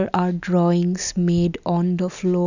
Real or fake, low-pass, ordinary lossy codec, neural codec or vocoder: real; 7.2 kHz; none; none